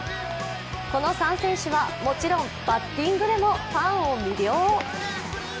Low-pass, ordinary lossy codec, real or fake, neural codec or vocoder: none; none; real; none